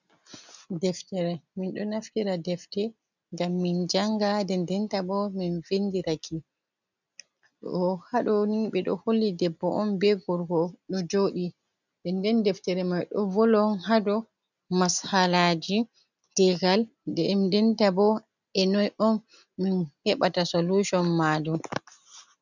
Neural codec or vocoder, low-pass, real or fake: none; 7.2 kHz; real